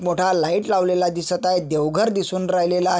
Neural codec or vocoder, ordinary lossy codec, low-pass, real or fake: none; none; none; real